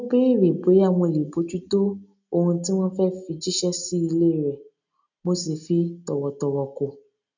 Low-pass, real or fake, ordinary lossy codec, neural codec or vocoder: 7.2 kHz; real; none; none